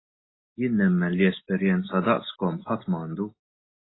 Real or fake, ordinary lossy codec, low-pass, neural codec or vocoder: real; AAC, 16 kbps; 7.2 kHz; none